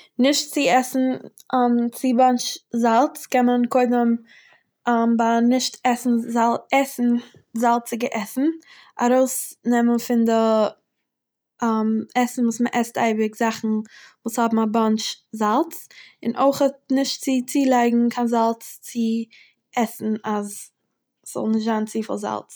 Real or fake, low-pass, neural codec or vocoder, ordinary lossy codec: real; none; none; none